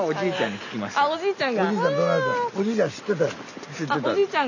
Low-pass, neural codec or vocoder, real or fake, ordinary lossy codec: 7.2 kHz; none; real; none